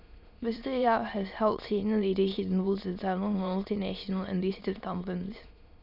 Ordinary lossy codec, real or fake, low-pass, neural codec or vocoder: none; fake; 5.4 kHz; autoencoder, 22.05 kHz, a latent of 192 numbers a frame, VITS, trained on many speakers